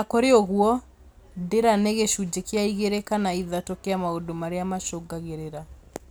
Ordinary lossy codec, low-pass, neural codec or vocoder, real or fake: none; none; none; real